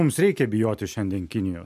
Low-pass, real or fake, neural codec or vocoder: 14.4 kHz; real; none